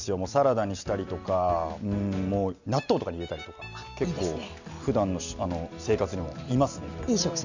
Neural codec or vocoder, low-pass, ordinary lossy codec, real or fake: none; 7.2 kHz; none; real